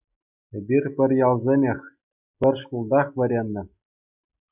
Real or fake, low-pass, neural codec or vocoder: real; 3.6 kHz; none